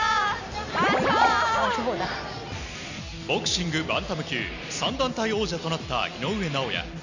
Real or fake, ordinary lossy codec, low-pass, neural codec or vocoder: real; none; 7.2 kHz; none